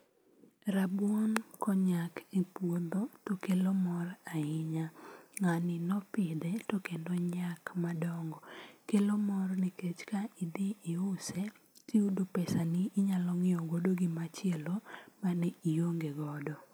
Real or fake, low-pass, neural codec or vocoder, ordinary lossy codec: real; none; none; none